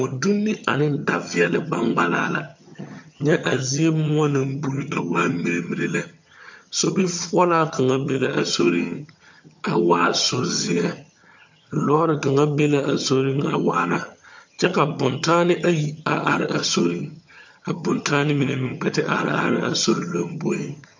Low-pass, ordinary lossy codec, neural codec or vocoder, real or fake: 7.2 kHz; MP3, 48 kbps; vocoder, 22.05 kHz, 80 mel bands, HiFi-GAN; fake